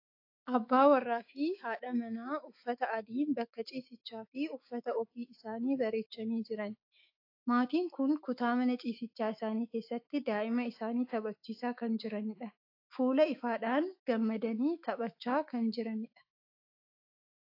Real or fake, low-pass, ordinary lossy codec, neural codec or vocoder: fake; 5.4 kHz; AAC, 32 kbps; autoencoder, 48 kHz, 128 numbers a frame, DAC-VAE, trained on Japanese speech